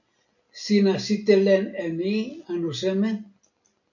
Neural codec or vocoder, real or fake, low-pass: none; real; 7.2 kHz